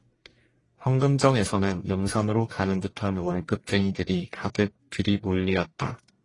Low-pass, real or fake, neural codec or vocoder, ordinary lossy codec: 10.8 kHz; fake; codec, 44.1 kHz, 1.7 kbps, Pupu-Codec; AAC, 32 kbps